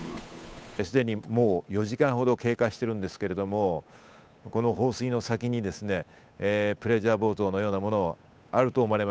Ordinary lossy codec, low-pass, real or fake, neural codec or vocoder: none; none; fake; codec, 16 kHz, 8 kbps, FunCodec, trained on Chinese and English, 25 frames a second